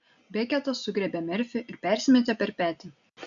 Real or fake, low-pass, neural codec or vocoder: real; 7.2 kHz; none